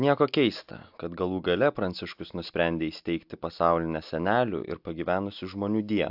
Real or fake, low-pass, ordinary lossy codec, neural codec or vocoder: real; 5.4 kHz; AAC, 48 kbps; none